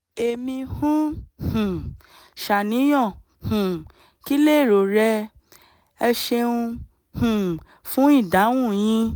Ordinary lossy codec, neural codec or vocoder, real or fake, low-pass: none; none; real; none